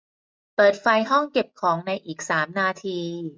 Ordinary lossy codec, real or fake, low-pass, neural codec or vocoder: none; real; none; none